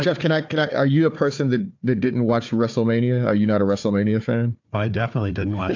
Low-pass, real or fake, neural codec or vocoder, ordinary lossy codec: 7.2 kHz; fake; codec, 16 kHz, 4 kbps, FunCodec, trained on Chinese and English, 50 frames a second; AAC, 48 kbps